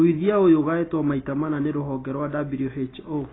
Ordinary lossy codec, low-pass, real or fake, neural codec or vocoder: AAC, 16 kbps; 7.2 kHz; real; none